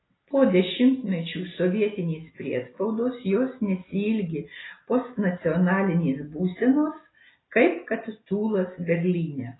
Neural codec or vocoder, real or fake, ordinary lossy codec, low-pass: none; real; AAC, 16 kbps; 7.2 kHz